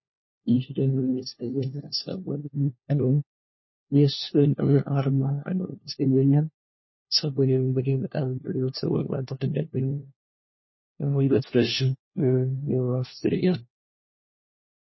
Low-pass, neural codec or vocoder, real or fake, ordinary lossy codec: 7.2 kHz; codec, 16 kHz, 1 kbps, FunCodec, trained on LibriTTS, 50 frames a second; fake; MP3, 24 kbps